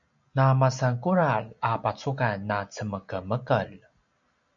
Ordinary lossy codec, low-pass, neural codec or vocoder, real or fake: MP3, 96 kbps; 7.2 kHz; none; real